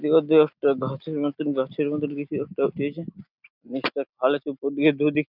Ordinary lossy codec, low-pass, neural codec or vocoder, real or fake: none; 5.4 kHz; none; real